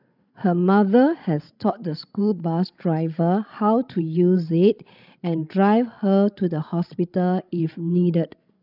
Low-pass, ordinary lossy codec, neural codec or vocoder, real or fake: 5.4 kHz; none; codec, 16 kHz, 16 kbps, FreqCodec, larger model; fake